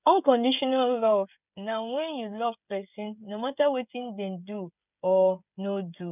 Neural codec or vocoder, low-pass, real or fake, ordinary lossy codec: codec, 16 kHz, 16 kbps, FreqCodec, smaller model; 3.6 kHz; fake; none